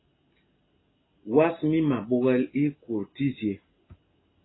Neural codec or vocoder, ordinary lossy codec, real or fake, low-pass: none; AAC, 16 kbps; real; 7.2 kHz